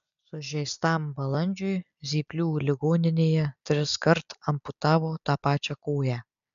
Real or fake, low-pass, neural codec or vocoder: real; 7.2 kHz; none